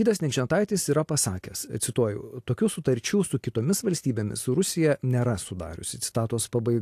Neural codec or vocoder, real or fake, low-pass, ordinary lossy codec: none; real; 14.4 kHz; AAC, 64 kbps